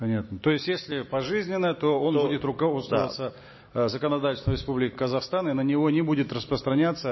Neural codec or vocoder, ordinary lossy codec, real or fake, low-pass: none; MP3, 24 kbps; real; 7.2 kHz